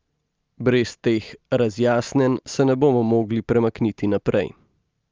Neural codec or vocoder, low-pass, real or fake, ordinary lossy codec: none; 7.2 kHz; real; Opus, 24 kbps